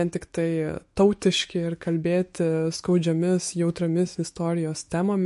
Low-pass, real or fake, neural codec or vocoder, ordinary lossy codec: 14.4 kHz; fake; autoencoder, 48 kHz, 128 numbers a frame, DAC-VAE, trained on Japanese speech; MP3, 48 kbps